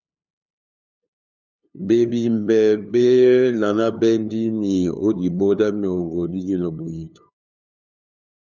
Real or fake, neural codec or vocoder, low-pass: fake; codec, 16 kHz, 2 kbps, FunCodec, trained on LibriTTS, 25 frames a second; 7.2 kHz